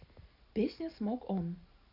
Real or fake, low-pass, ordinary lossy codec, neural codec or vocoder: real; 5.4 kHz; none; none